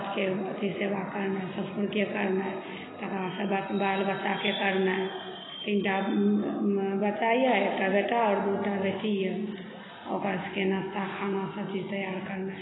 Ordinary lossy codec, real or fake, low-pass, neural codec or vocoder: AAC, 16 kbps; real; 7.2 kHz; none